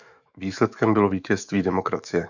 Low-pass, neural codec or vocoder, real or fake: 7.2 kHz; autoencoder, 48 kHz, 128 numbers a frame, DAC-VAE, trained on Japanese speech; fake